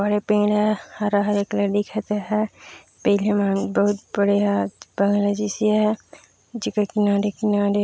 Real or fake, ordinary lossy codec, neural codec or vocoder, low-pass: real; none; none; none